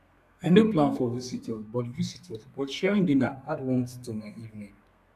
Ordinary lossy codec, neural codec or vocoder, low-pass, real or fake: none; codec, 32 kHz, 1.9 kbps, SNAC; 14.4 kHz; fake